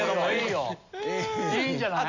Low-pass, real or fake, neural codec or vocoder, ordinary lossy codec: 7.2 kHz; real; none; none